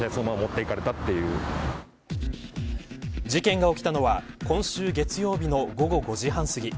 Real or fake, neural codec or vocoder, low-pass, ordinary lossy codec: real; none; none; none